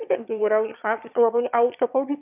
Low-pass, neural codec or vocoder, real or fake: 3.6 kHz; autoencoder, 22.05 kHz, a latent of 192 numbers a frame, VITS, trained on one speaker; fake